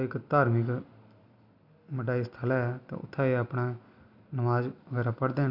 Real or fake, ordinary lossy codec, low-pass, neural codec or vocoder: real; none; 5.4 kHz; none